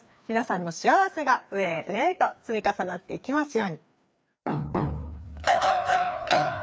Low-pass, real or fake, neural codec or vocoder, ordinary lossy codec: none; fake; codec, 16 kHz, 2 kbps, FreqCodec, larger model; none